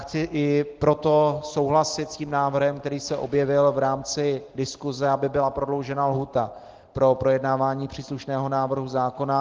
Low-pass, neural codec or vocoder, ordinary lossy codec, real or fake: 7.2 kHz; none; Opus, 16 kbps; real